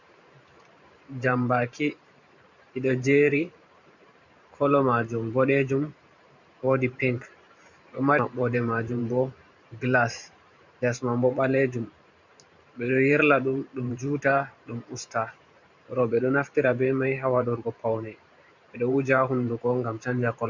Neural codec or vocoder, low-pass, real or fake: vocoder, 44.1 kHz, 128 mel bands every 512 samples, BigVGAN v2; 7.2 kHz; fake